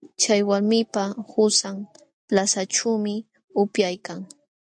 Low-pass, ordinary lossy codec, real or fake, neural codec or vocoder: 9.9 kHz; AAC, 64 kbps; real; none